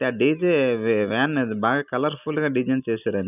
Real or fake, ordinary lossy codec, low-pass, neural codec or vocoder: real; none; 3.6 kHz; none